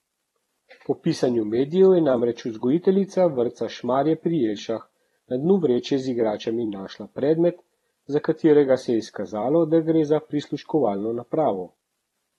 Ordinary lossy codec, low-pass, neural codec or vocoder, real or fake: AAC, 32 kbps; 19.8 kHz; vocoder, 44.1 kHz, 128 mel bands every 256 samples, BigVGAN v2; fake